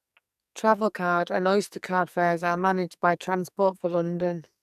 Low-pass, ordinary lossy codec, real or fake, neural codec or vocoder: 14.4 kHz; none; fake; codec, 44.1 kHz, 2.6 kbps, SNAC